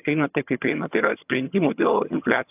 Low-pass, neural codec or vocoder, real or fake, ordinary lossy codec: 3.6 kHz; vocoder, 22.05 kHz, 80 mel bands, HiFi-GAN; fake; AAC, 32 kbps